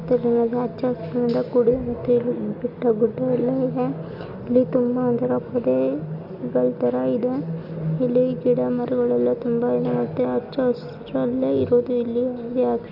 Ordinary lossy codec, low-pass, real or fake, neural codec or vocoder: none; 5.4 kHz; real; none